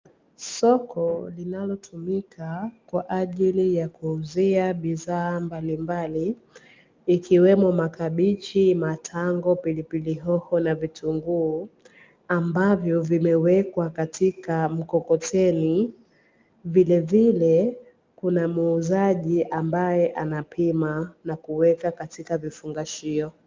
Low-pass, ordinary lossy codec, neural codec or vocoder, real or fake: 7.2 kHz; Opus, 24 kbps; none; real